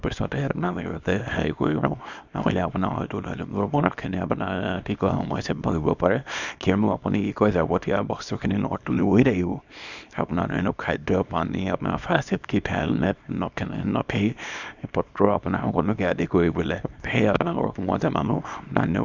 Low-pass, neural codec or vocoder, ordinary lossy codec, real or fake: 7.2 kHz; codec, 24 kHz, 0.9 kbps, WavTokenizer, small release; none; fake